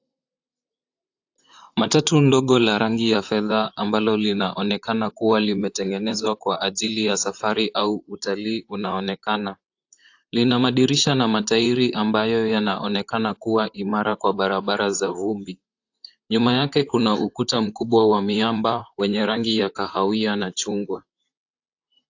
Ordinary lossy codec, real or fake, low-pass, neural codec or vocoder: AAC, 48 kbps; fake; 7.2 kHz; vocoder, 44.1 kHz, 128 mel bands, Pupu-Vocoder